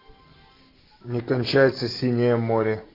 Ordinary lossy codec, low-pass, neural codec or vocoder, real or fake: AAC, 24 kbps; 5.4 kHz; none; real